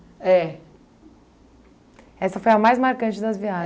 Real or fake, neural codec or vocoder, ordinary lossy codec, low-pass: real; none; none; none